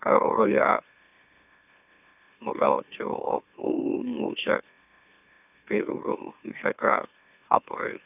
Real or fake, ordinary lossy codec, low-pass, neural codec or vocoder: fake; none; 3.6 kHz; autoencoder, 44.1 kHz, a latent of 192 numbers a frame, MeloTTS